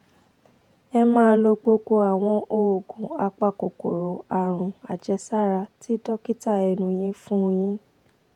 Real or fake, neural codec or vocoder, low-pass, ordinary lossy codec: fake; vocoder, 48 kHz, 128 mel bands, Vocos; 19.8 kHz; none